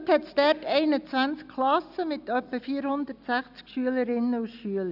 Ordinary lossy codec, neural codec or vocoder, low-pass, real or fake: none; none; 5.4 kHz; real